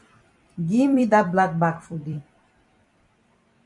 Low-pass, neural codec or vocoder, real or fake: 10.8 kHz; vocoder, 44.1 kHz, 128 mel bands every 256 samples, BigVGAN v2; fake